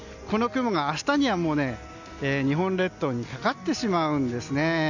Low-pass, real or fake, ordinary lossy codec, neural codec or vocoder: 7.2 kHz; real; none; none